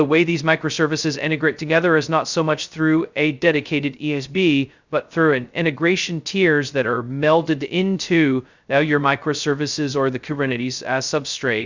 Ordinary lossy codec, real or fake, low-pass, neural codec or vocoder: Opus, 64 kbps; fake; 7.2 kHz; codec, 16 kHz, 0.2 kbps, FocalCodec